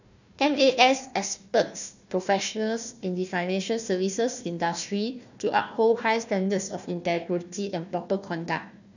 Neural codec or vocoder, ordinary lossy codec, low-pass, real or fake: codec, 16 kHz, 1 kbps, FunCodec, trained on Chinese and English, 50 frames a second; none; 7.2 kHz; fake